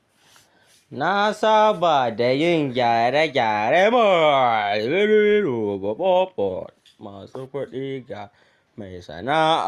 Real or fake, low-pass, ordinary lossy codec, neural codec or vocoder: real; 14.4 kHz; Opus, 64 kbps; none